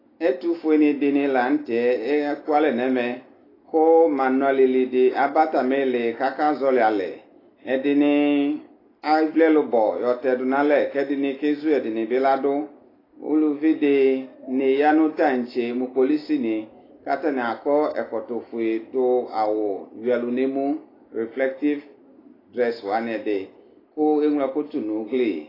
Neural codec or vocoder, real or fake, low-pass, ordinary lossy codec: none; real; 5.4 kHz; AAC, 24 kbps